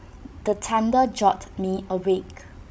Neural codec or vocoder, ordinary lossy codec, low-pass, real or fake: codec, 16 kHz, 16 kbps, FreqCodec, larger model; none; none; fake